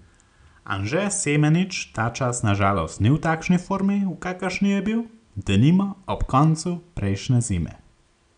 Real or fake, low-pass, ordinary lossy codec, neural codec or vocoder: real; 9.9 kHz; none; none